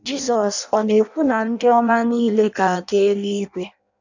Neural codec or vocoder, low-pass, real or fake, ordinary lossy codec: codec, 16 kHz in and 24 kHz out, 0.6 kbps, FireRedTTS-2 codec; 7.2 kHz; fake; none